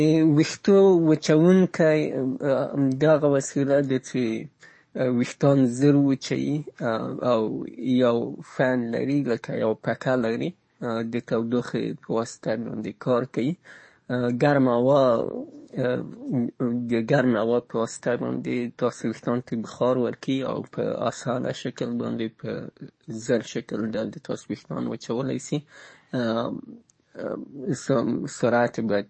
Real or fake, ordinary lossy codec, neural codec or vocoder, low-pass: fake; MP3, 32 kbps; codec, 44.1 kHz, 7.8 kbps, DAC; 9.9 kHz